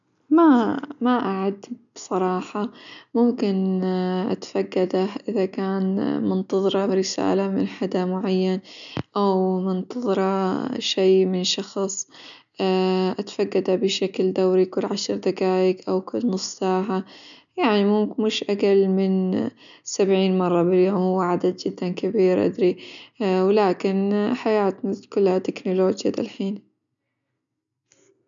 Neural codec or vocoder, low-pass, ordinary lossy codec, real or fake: none; 7.2 kHz; none; real